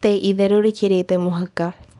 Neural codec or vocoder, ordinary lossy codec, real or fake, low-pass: codec, 24 kHz, 0.9 kbps, WavTokenizer, small release; none; fake; 10.8 kHz